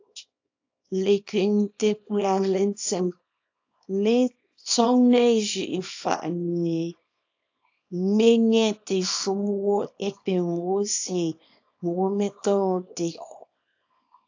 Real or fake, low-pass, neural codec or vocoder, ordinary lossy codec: fake; 7.2 kHz; codec, 24 kHz, 0.9 kbps, WavTokenizer, small release; AAC, 48 kbps